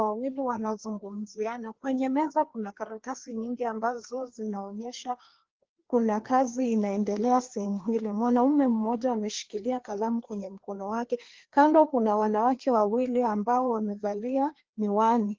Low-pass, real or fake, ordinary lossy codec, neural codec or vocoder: 7.2 kHz; fake; Opus, 16 kbps; codec, 16 kHz, 2 kbps, FreqCodec, larger model